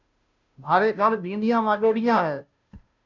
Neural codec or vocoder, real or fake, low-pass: codec, 16 kHz, 0.5 kbps, FunCodec, trained on Chinese and English, 25 frames a second; fake; 7.2 kHz